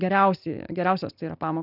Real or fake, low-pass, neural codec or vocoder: real; 5.4 kHz; none